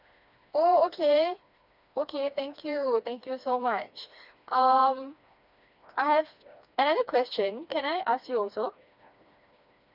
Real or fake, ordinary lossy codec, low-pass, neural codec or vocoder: fake; none; 5.4 kHz; codec, 16 kHz, 2 kbps, FreqCodec, smaller model